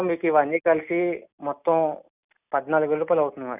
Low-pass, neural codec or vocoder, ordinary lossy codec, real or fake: 3.6 kHz; none; none; real